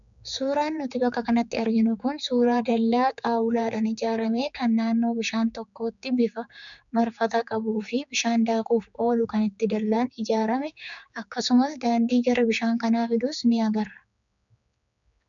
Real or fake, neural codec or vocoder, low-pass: fake; codec, 16 kHz, 4 kbps, X-Codec, HuBERT features, trained on general audio; 7.2 kHz